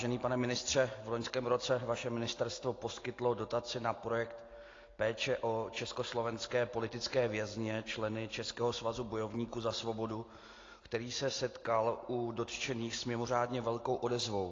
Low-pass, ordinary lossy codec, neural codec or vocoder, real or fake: 7.2 kHz; AAC, 32 kbps; none; real